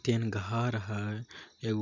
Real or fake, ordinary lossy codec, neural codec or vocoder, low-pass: real; none; none; 7.2 kHz